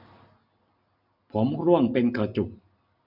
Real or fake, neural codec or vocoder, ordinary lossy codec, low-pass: real; none; none; 5.4 kHz